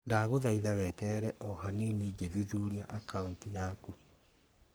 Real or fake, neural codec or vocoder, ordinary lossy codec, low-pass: fake; codec, 44.1 kHz, 3.4 kbps, Pupu-Codec; none; none